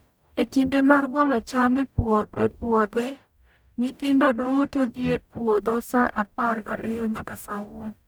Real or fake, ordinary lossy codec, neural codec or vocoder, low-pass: fake; none; codec, 44.1 kHz, 0.9 kbps, DAC; none